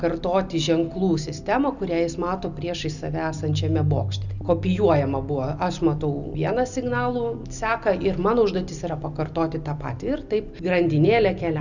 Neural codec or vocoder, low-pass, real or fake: none; 7.2 kHz; real